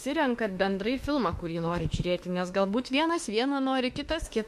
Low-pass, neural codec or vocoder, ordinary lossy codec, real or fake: 14.4 kHz; autoencoder, 48 kHz, 32 numbers a frame, DAC-VAE, trained on Japanese speech; MP3, 64 kbps; fake